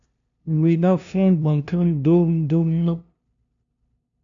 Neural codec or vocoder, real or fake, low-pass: codec, 16 kHz, 0.5 kbps, FunCodec, trained on LibriTTS, 25 frames a second; fake; 7.2 kHz